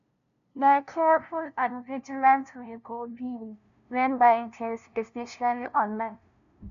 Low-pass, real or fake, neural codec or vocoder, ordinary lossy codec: 7.2 kHz; fake; codec, 16 kHz, 0.5 kbps, FunCodec, trained on LibriTTS, 25 frames a second; none